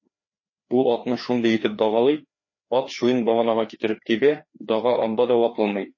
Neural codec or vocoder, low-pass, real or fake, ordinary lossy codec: codec, 16 kHz, 2 kbps, FreqCodec, larger model; 7.2 kHz; fake; MP3, 32 kbps